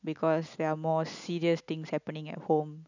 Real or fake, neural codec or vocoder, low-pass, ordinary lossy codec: real; none; 7.2 kHz; none